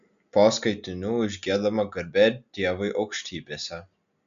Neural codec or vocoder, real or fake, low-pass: none; real; 7.2 kHz